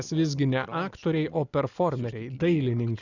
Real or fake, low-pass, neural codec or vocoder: real; 7.2 kHz; none